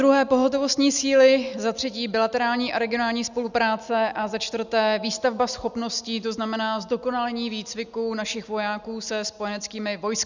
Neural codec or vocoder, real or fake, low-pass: none; real; 7.2 kHz